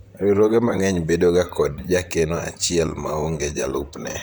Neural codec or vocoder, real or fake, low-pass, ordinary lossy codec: vocoder, 44.1 kHz, 128 mel bands, Pupu-Vocoder; fake; none; none